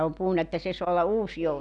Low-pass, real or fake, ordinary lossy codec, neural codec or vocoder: 10.8 kHz; real; none; none